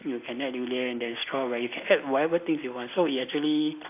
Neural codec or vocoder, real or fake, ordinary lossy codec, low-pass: none; real; AAC, 24 kbps; 3.6 kHz